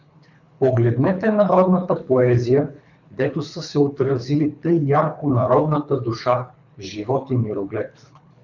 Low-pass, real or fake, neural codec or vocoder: 7.2 kHz; fake; codec, 24 kHz, 3 kbps, HILCodec